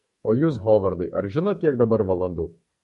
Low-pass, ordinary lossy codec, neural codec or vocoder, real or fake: 14.4 kHz; MP3, 48 kbps; codec, 44.1 kHz, 2.6 kbps, SNAC; fake